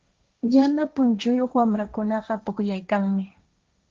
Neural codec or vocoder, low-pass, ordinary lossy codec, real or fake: codec, 16 kHz, 1.1 kbps, Voila-Tokenizer; 7.2 kHz; Opus, 24 kbps; fake